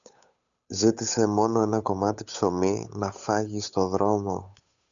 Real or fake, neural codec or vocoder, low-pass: fake; codec, 16 kHz, 8 kbps, FunCodec, trained on Chinese and English, 25 frames a second; 7.2 kHz